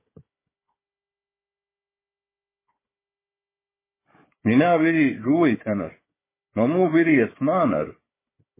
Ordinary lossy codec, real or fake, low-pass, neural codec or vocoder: MP3, 16 kbps; fake; 3.6 kHz; codec, 16 kHz, 4 kbps, FunCodec, trained on Chinese and English, 50 frames a second